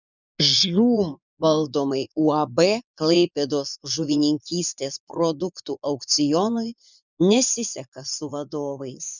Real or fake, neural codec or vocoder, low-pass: fake; vocoder, 24 kHz, 100 mel bands, Vocos; 7.2 kHz